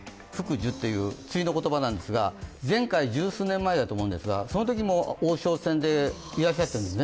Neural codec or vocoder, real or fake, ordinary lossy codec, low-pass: none; real; none; none